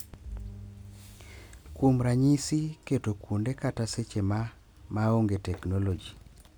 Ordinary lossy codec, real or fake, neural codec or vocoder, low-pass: none; real; none; none